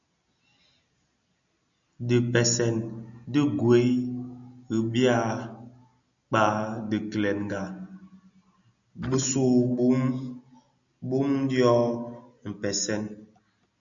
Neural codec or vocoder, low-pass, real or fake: none; 7.2 kHz; real